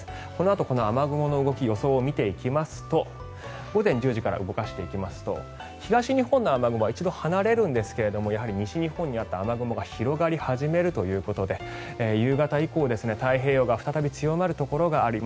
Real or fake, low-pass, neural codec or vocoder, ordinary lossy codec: real; none; none; none